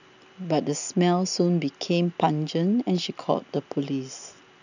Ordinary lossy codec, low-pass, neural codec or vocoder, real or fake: none; 7.2 kHz; none; real